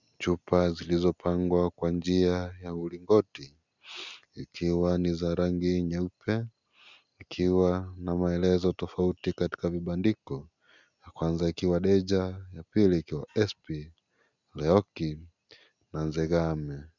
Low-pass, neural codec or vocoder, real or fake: 7.2 kHz; none; real